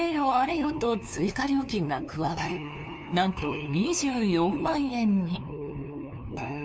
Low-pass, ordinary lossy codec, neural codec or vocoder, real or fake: none; none; codec, 16 kHz, 2 kbps, FunCodec, trained on LibriTTS, 25 frames a second; fake